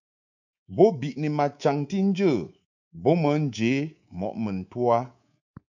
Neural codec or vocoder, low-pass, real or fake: codec, 24 kHz, 3.1 kbps, DualCodec; 7.2 kHz; fake